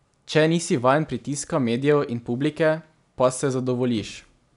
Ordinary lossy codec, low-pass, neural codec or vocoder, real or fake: none; 10.8 kHz; none; real